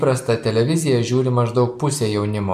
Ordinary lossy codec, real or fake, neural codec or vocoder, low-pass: MP3, 96 kbps; real; none; 14.4 kHz